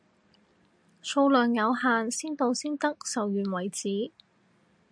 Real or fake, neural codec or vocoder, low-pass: real; none; 9.9 kHz